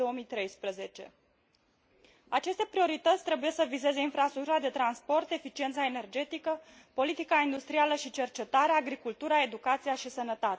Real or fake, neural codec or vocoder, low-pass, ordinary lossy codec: real; none; none; none